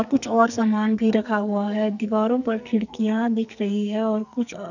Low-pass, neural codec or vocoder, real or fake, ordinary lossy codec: 7.2 kHz; codec, 44.1 kHz, 2.6 kbps, SNAC; fake; none